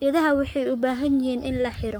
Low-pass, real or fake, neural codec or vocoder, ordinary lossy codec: none; fake; codec, 44.1 kHz, 7.8 kbps, Pupu-Codec; none